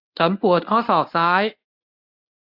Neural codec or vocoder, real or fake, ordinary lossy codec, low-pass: codec, 24 kHz, 0.9 kbps, WavTokenizer, medium speech release version 1; fake; AAC, 48 kbps; 5.4 kHz